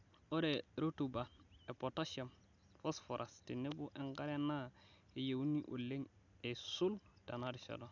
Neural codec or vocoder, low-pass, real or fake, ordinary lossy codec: none; 7.2 kHz; real; none